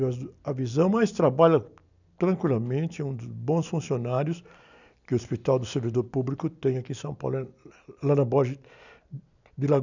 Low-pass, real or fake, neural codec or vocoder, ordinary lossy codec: 7.2 kHz; real; none; none